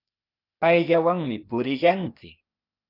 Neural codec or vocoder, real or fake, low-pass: codec, 16 kHz, 0.8 kbps, ZipCodec; fake; 5.4 kHz